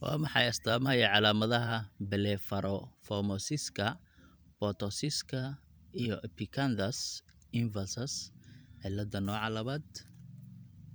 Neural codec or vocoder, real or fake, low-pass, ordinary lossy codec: vocoder, 44.1 kHz, 128 mel bands every 512 samples, BigVGAN v2; fake; none; none